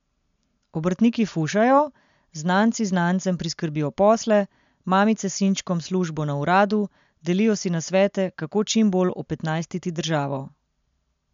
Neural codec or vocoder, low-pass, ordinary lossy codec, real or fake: none; 7.2 kHz; MP3, 64 kbps; real